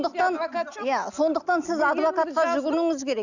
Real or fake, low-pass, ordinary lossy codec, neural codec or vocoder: real; 7.2 kHz; none; none